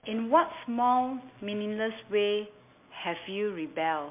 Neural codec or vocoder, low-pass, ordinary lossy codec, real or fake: none; 3.6 kHz; MP3, 32 kbps; real